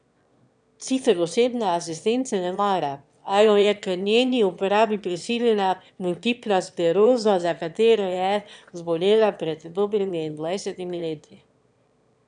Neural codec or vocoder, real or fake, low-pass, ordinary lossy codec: autoencoder, 22.05 kHz, a latent of 192 numbers a frame, VITS, trained on one speaker; fake; 9.9 kHz; none